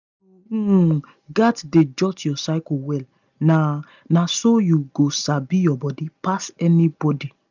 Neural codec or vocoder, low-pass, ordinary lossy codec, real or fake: none; 7.2 kHz; none; real